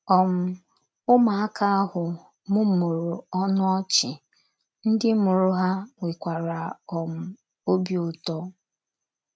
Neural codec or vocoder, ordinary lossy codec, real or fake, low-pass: none; none; real; none